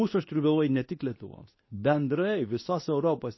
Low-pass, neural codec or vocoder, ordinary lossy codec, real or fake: 7.2 kHz; codec, 24 kHz, 0.9 kbps, WavTokenizer, medium speech release version 2; MP3, 24 kbps; fake